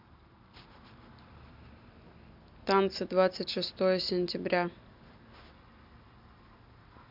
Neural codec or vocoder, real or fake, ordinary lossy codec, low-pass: none; real; MP3, 48 kbps; 5.4 kHz